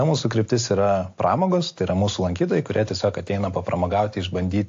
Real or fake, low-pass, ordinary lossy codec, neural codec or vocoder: real; 7.2 kHz; AAC, 48 kbps; none